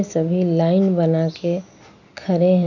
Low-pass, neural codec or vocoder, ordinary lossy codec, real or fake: 7.2 kHz; none; none; real